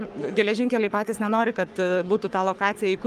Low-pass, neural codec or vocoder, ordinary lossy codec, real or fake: 14.4 kHz; codec, 44.1 kHz, 3.4 kbps, Pupu-Codec; Opus, 32 kbps; fake